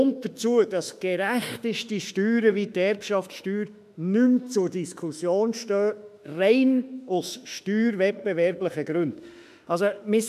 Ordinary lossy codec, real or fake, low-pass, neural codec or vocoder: none; fake; 14.4 kHz; autoencoder, 48 kHz, 32 numbers a frame, DAC-VAE, trained on Japanese speech